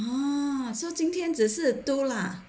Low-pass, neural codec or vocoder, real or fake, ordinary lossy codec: none; none; real; none